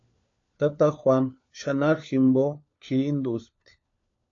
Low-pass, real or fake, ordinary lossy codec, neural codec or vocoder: 7.2 kHz; fake; AAC, 48 kbps; codec, 16 kHz, 4 kbps, FunCodec, trained on LibriTTS, 50 frames a second